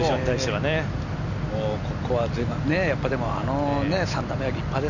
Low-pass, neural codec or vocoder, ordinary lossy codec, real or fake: 7.2 kHz; none; none; real